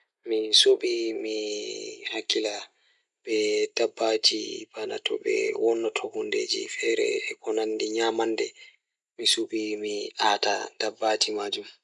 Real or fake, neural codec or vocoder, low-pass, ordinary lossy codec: real; none; 10.8 kHz; none